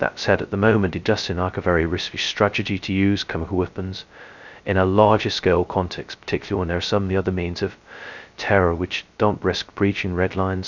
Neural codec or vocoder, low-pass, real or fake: codec, 16 kHz, 0.2 kbps, FocalCodec; 7.2 kHz; fake